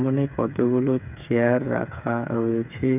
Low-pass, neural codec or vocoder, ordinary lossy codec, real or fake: 3.6 kHz; codec, 16 kHz, 8 kbps, FreqCodec, smaller model; none; fake